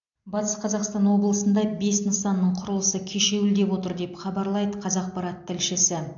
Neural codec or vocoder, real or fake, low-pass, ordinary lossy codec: none; real; 7.2 kHz; AAC, 64 kbps